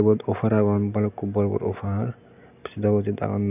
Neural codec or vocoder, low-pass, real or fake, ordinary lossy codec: none; 3.6 kHz; real; none